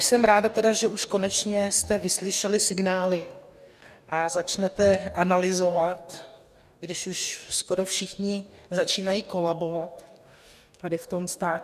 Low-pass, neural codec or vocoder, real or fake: 14.4 kHz; codec, 44.1 kHz, 2.6 kbps, DAC; fake